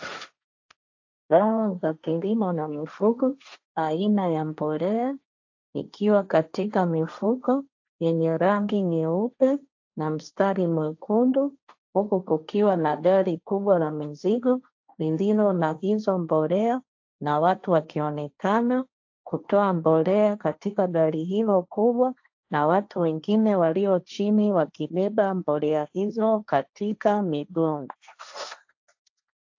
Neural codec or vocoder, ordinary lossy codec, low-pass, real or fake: codec, 16 kHz, 1.1 kbps, Voila-Tokenizer; AAC, 48 kbps; 7.2 kHz; fake